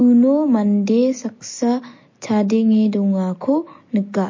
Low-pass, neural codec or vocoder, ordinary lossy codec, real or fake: 7.2 kHz; none; MP3, 32 kbps; real